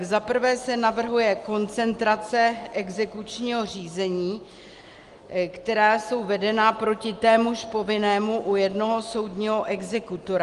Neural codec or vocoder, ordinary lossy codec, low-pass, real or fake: none; Opus, 24 kbps; 10.8 kHz; real